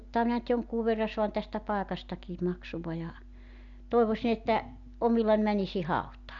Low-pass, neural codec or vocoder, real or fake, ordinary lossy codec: 7.2 kHz; none; real; none